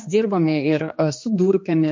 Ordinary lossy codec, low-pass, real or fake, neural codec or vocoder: MP3, 48 kbps; 7.2 kHz; fake; codec, 16 kHz, 2 kbps, X-Codec, HuBERT features, trained on general audio